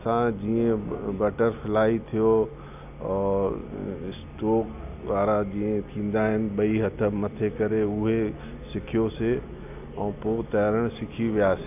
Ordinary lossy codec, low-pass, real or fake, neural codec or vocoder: none; 3.6 kHz; real; none